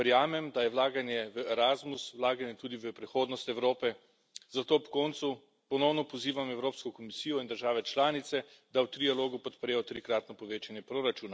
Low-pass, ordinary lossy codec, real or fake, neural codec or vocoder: none; none; real; none